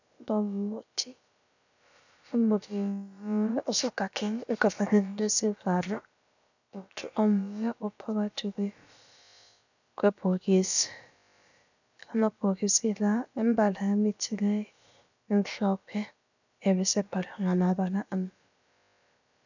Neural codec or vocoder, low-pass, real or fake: codec, 16 kHz, about 1 kbps, DyCAST, with the encoder's durations; 7.2 kHz; fake